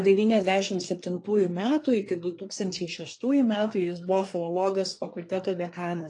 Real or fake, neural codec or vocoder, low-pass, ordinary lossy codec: fake; codec, 24 kHz, 1 kbps, SNAC; 10.8 kHz; AAC, 48 kbps